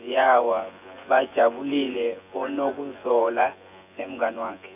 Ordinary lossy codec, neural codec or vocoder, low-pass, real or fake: none; vocoder, 24 kHz, 100 mel bands, Vocos; 3.6 kHz; fake